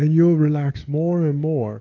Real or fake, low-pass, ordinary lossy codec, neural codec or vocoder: real; 7.2 kHz; AAC, 32 kbps; none